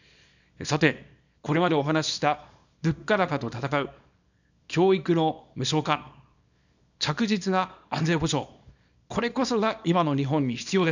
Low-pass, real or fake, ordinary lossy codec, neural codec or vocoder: 7.2 kHz; fake; none; codec, 24 kHz, 0.9 kbps, WavTokenizer, small release